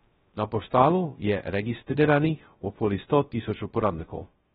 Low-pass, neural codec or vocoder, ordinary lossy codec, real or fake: 7.2 kHz; codec, 16 kHz, 0.2 kbps, FocalCodec; AAC, 16 kbps; fake